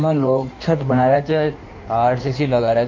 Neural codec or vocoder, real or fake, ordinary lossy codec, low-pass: codec, 16 kHz in and 24 kHz out, 1.1 kbps, FireRedTTS-2 codec; fake; MP3, 48 kbps; 7.2 kHz